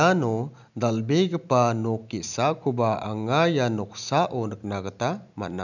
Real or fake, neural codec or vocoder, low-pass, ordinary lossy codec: real; none; 7.2 kHz; none